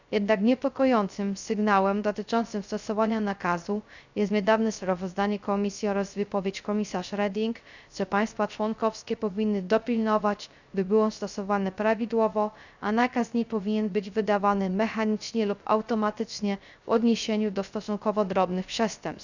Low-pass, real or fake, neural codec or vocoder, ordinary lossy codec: 7.2 kHz; fake; codec, 16 kHz, 0.3 kbps, FocalCodec; none